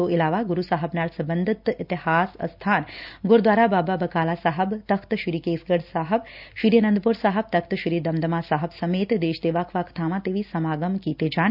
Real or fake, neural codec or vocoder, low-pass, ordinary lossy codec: real; none; 5.4 kHz; none